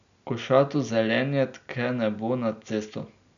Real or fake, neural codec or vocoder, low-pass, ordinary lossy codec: real; none; 7.2 kHz; none